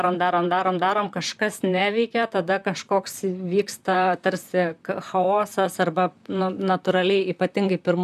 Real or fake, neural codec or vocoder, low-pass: fake; vocoder, 44.1 kHz, 128 mel bands, Pupu-Vocoder; 14.4 kHz